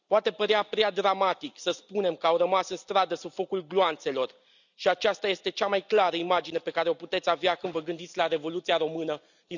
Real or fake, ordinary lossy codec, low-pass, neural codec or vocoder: real; none; 7.2 kHz; none